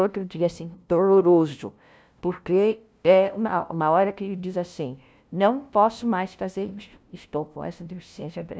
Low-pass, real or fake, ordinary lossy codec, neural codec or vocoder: none; fake; none; codec, 16 kHz, 0.5 kbps, FunCodec, trained on LibriTTS, 25 frames a second